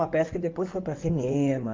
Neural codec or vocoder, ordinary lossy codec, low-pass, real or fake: codec, 16 kHz in and 24 kHz out, 2.2 kbps, FireRedTTS-2 codec; Opus, 24 kbps; 7.2 kHz; fake